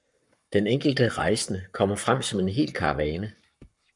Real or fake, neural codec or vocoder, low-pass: fake; codec, 44.1 kHz, 7.8 kbps, Pupu-Codec; 10.8 kHz